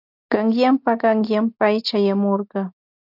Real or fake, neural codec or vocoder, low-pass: real; none; 5.4 kHz